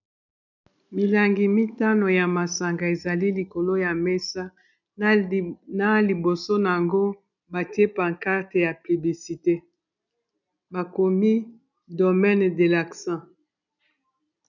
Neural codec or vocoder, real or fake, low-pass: none; real; 7.2 kHz